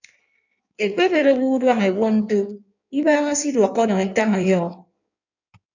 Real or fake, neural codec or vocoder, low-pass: fake; codec, 16 kHz in and 24 kHz out, 1.1 kbps, FireRedTTS-2 codec; 7.2 kHz